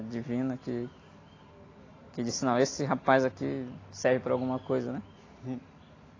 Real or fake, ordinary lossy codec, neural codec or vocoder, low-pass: real; AAC, 32 kbps; none; 7.2 kHz